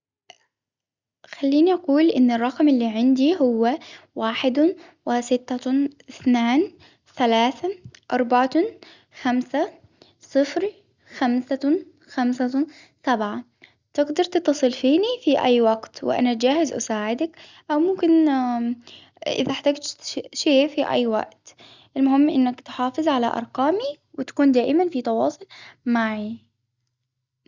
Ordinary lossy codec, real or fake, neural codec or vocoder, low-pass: Opus, 64 kbps; real; none; 7.2 kHz